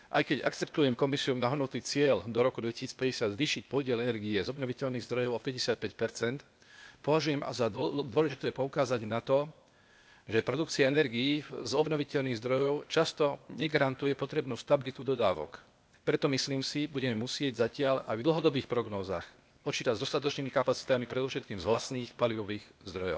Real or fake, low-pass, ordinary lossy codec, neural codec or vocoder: fake; none; none; codec, 16 kHz, 0.8 kbps, ZipCodec